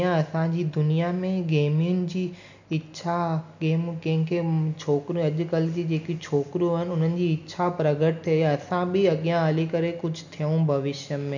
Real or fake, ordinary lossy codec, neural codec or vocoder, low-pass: real; none; none; 7.2 kHz